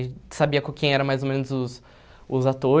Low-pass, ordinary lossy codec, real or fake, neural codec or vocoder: none; none; real; none